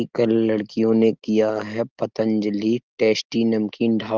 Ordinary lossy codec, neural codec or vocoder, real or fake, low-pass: Opus, 16 kbps; none; real; 7.2 kHz